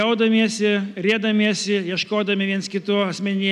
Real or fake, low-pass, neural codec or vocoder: real; 14.4 kHz; none